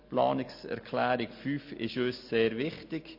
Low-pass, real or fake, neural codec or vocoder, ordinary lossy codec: 5.4 kHz; real; none; MP3, 32 kbps